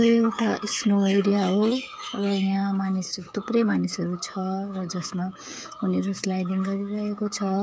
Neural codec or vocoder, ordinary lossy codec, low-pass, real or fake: codec, 16 kHz, 8 kbps, FreqCodec, smaller model; none; none; fake